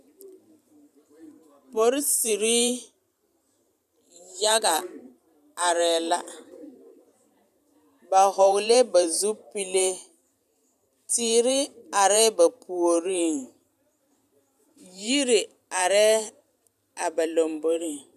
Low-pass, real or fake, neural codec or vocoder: 14.4 kHz; fake; vocoder, 44.1 kHz, 128 mel bands every 512 samples, BigVGAN v2